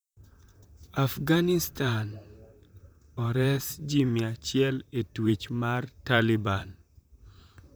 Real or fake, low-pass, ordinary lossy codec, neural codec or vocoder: fake; none; none; vocoder, 44.1 kHz, 128 mel bands, Pupu-Vocoder